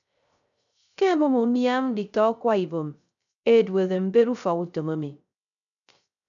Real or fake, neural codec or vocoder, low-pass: fake; codec, 16 kHz, 0.3 kbps, FocalCodec; 7.2 kHz